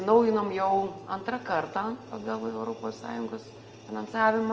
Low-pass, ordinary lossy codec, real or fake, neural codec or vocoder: 7.2 kHz; Opus, 24 kbps; real; none